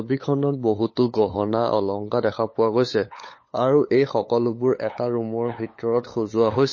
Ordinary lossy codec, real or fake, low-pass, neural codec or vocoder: MP3, 32 kbps; fake; 7.2 kHz; codec, 16 kHz, 8 kbps, FunCodec, trained on LibriTTS, 25 frames a second